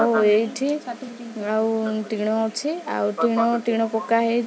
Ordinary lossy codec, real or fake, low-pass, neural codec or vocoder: none; real; none; none